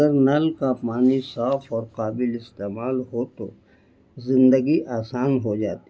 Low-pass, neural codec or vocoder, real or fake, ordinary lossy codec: none; none; real; none